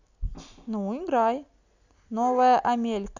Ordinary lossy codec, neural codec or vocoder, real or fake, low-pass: none; none; real; 7.2 kHz